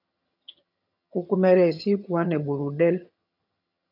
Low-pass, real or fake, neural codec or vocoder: 5.4 kHz; fake; vocoder, 22.05 kHz, 80 mel bands, HiFi-GAN